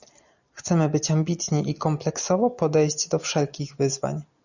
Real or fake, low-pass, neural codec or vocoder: real; 7.2 kHz; none